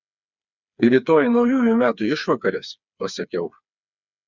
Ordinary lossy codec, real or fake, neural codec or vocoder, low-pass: Opus, 64 kbps; fake; codec, 16 kHz, 4 kbps, FreqCodec, smaller model; 7.2 kHz